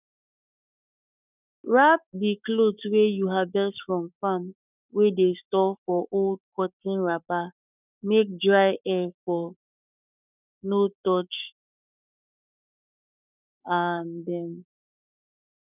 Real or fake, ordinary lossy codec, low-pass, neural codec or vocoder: fake; none; 3.6 kHz; codec, 44.1 kHz, 7.8 kbps, Pupu-Codec